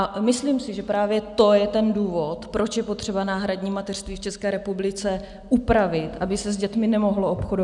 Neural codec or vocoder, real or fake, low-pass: none; real; 10.8 kHz